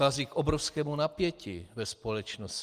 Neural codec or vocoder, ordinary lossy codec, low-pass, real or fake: none; Opus, 24 kbps; 14.4 kHz; real